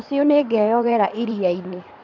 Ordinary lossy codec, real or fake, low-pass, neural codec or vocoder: none; fake; 7.2 kHz; codec, 16 kHz in and 24 kHz out, 2.2 kbps, FireRedTTS-2 codec